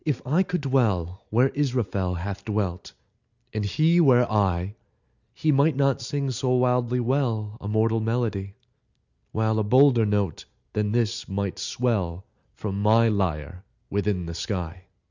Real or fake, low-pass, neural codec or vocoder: real; 7.2 kHz; none